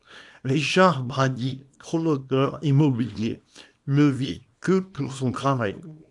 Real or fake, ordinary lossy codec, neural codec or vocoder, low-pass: fake; AAC, 64 kbps; codec, 24 kHz, 0.9 kbps, WavTokenizer, small release; 10.8 kHz